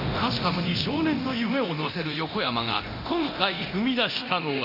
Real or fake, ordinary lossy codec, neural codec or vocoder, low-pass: fake; none; codec, 24 kHz, 0.9 kbps, DualCodec; 5.4 kHz